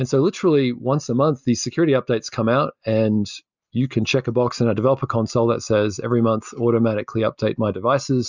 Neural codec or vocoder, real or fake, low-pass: none; real; 7.2 kHz